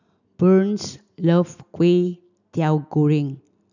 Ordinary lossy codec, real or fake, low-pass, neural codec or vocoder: none; real; 7.2 kHz; none